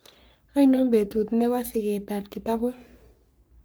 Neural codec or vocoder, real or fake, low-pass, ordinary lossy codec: codec, 44.1 kHz, 3.4 kbps, Pupu-Codec; fake; none; none